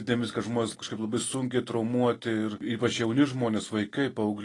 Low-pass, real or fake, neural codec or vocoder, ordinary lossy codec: 10.8 kHz; real; none; AAC, 32 kbps